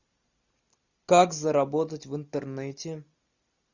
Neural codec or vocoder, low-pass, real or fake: none; 7.2 kHz; real